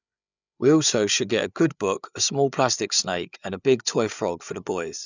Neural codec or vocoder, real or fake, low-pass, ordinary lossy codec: codec, 16 kHz, 8 kbps, FreqCodec, larger model; fake; 7.2 kHz; none